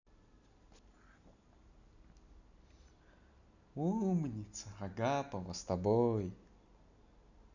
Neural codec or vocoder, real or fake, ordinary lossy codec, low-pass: none; real; none; 7.2 kHz